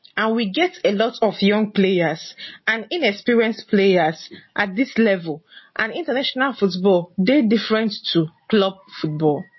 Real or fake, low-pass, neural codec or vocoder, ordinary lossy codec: real; 7.2 kHz; none; MP3, 24 kbps